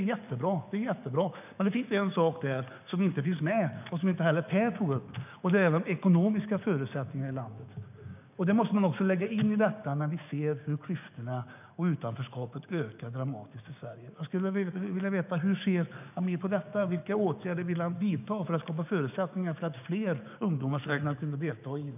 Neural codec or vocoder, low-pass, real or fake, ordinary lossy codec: codec, 16 kHz, 6 kbps, DAC; 3.6 kHz; fake; none